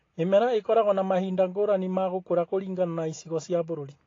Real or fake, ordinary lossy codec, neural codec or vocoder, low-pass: real; AAC, 32 kbps; none; 7.2 kHz